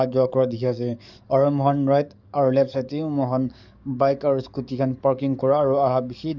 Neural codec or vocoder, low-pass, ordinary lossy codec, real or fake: codec, 44.1 kHz, 7.8 kbps, DAC; 7.2 kHz; none; fake